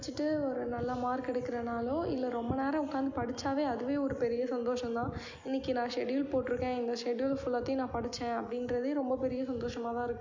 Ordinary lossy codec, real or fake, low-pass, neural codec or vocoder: MP3, 48 kbps; real; 7.2 kHz; none